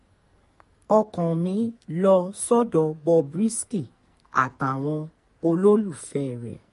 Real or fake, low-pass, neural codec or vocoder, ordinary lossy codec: fake; 14.4 kHz; codec, 44.1 kHz, 2.6 kbps, SNAC; MP3, 48 kbps